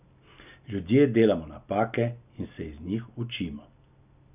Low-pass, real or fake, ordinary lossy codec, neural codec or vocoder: 3.6 kHz; real; none; none